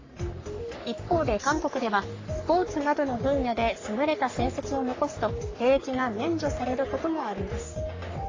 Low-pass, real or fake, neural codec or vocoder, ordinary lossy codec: 7.2 kHz; fake; codec, 44.1 kHz, 3.4 kbps, Pupu-Codec; AAC, 32 kbps